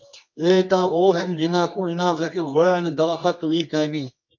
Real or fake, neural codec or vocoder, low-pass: fake; codec, 24 kHz, 0.9 kbps, WavTokenizer, medium music audio release; 7.2 kHz